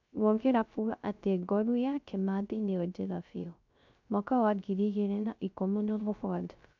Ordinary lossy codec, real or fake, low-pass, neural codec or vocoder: none; fake; 7.2 kHz; codec, 16 kHz, 0.3 kbps, FocalCodec